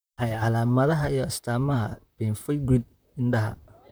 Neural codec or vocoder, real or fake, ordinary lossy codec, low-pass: vocoder, 44.1 kHz, 128 mel bands, Pupu-Vocoder; fake; none; none